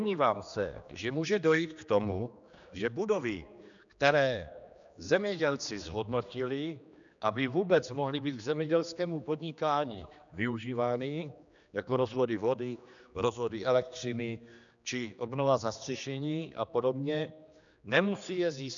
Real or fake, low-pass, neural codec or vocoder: fake; 7.2 kHz; codec, 16 kHz, 2 kbps, X-Codec, HuBERT features, trained on general audio